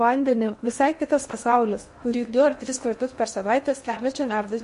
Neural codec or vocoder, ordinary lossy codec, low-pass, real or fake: codec, 16 kHz in and 24 kHz out, 0.6 kbps, FocalCodec, streaming, 2048 codes; MP3, 48 kbps; 10.8 kHz; fake